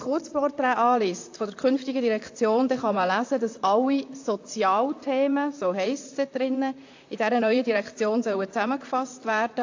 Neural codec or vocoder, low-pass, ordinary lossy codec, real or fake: vocoder, 44.1 kHz, 128 mel bands, Pupu-Vocoder; 7.2 kHz; AAC, 48 kbps; fake